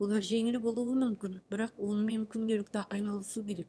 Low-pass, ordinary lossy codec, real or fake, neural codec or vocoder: none; none; fake; autoencoder, 22.05 kHz, a latent of 192 numbers a frame, VITS, trained on one speaker